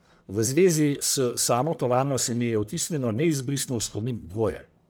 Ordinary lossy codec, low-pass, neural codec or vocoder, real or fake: none; none; codec, 44.1 kHz, 1.7 kbps, Pupu-Codec; fake